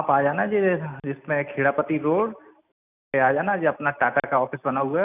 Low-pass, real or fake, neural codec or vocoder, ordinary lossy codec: 3.6 kHz; real; none; none